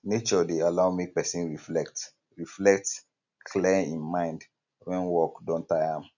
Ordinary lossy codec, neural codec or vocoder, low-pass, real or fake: none; none; 7.2 kHz; real